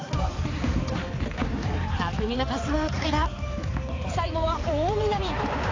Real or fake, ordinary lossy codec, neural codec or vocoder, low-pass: fake; AAC, 32 kbps; codec, 16 kHz, 4 kbps, X-Codec, HuBERT features, trained on balanced general audio; 7.2 kHz